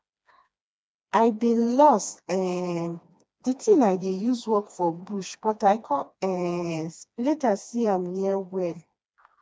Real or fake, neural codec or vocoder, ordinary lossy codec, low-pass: fake; codec, 16 kHz, 2 kbps, FreqCodec, smaller model; none; none